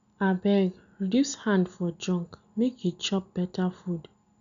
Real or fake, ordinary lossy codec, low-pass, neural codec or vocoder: real; none; 7.2 kHz; none